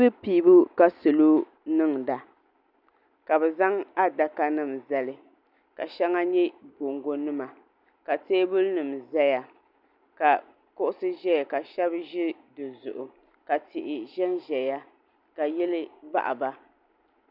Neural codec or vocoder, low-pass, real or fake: none; 5.4 kHz; real